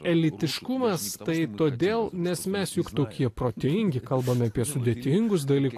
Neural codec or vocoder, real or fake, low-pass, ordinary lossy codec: none; real; 14.4 kHz; AAC, 64 kbps